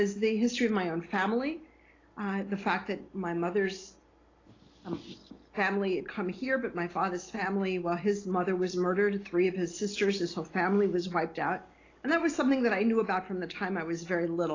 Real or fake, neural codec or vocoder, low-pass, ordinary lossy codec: real; none; 7.2 kHz; AAC, 32 kbps